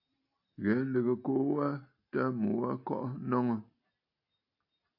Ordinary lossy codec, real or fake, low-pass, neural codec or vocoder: AAC, 48 kbps; real; 5.4 kHz; none